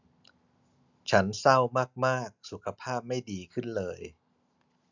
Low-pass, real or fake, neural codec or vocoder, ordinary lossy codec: 7.2 kHz; real; none; none